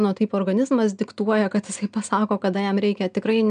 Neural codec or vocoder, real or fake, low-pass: none; real; 9.9 kHz